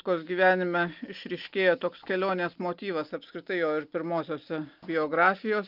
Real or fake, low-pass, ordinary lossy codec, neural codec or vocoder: real; 5.4 kHz; Opus, 24 kbps; none